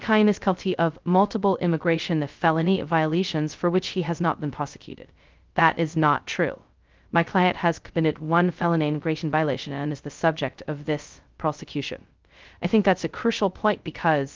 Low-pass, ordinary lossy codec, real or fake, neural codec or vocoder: 7.2 kHz; Opus, 32 kbps; fake; codec, 16 kHz, 0.2 kbps, FocalCodec